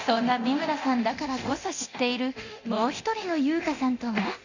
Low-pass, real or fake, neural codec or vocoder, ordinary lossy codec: 7.2 kHz; fake; codec, 24 kHz, 0.9 kbps, DualCodec; Opus, 64 kbps